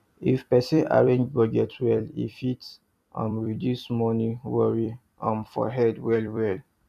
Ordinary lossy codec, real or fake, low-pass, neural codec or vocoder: none; fake; 14.4 kHz; vocoder, 44.1 kHz, 128 mel bands every 256 samples, BigVGAN v2